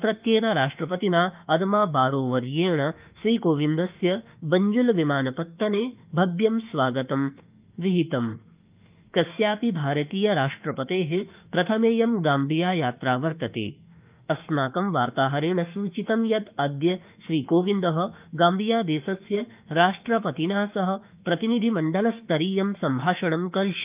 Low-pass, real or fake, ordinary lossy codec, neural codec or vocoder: 3.6 kHz; fake; Opus, 24 kbps; autoencoder, 48 kHz, 32 numbers a frame, DAC-VAE, trained on Japanese speech